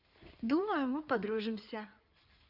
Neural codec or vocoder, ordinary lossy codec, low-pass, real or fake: codec, 16 kHz in and 24 kHz out, 2.2 kbps, FireRedTTS-2 codec; Opus, 64 kbps; 5.4 kHz; fake